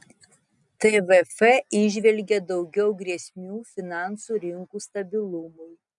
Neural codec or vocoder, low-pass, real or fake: none; 10.8 kHz; real